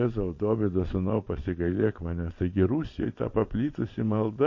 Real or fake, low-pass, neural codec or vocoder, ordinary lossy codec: fake; 7.2 kHz; vocoder, 22.05 kHz, 80 mel bands, Vocos; MP3, 32 kbps